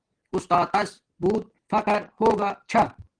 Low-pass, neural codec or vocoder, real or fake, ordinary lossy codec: 9.9 kHz; none; real; Opus, 16 kbps